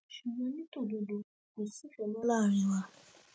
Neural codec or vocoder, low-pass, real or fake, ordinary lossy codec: none; none; real; none